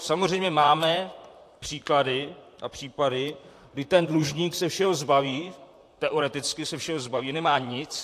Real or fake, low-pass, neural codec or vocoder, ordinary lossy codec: fake; 14.4 kHz; vocoder, 44.1 kHz, 128 mel bands, Pupu-Vocoder; AAC, 64 kbps